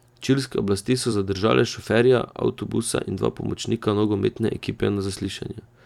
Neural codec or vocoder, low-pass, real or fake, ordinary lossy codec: vocoder, 44.1 kHz, 128 mel bands every 256 samples, BigVGAN v2; 19.8 kHz; fake; none